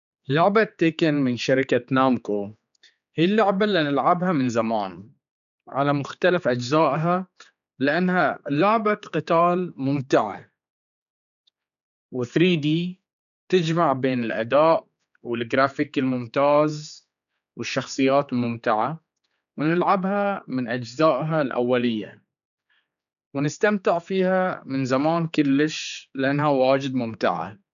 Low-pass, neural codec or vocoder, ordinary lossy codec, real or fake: 7.2 kHz; codec, 16 kHz, 4 kbps, X-Codec, HuBERT features, trained on general audio; none; fake